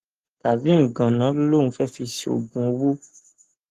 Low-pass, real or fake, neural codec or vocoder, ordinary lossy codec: 14.4 kHz; fake; codec, 44.1 kHz, 7.8 kbps, Pupu-Codec; Opus, 16 kbps